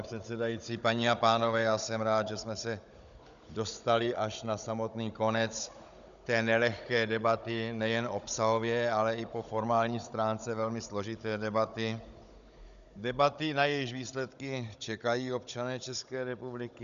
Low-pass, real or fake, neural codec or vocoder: 7.2 kHz; fake; codec, 16 kHz, 16 kbps, FunCodec, trained on Chinese and English, 50 frames a second